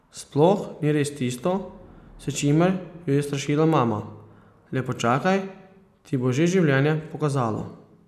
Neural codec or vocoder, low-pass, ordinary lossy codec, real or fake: none; 14.4 kHz; none; real